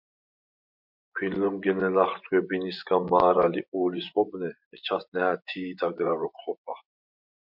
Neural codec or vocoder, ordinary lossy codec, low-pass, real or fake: vocoder, 24 kHz, 100 mel bands, Vocos; MP3, 48 kbps; 5.4 kHz; fake